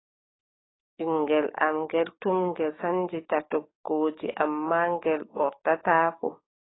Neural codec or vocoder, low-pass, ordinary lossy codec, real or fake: codec, 44.1 kHz, 7.8 kbps, DAC; 7.2 kHz; AAC, 16 kbps; fake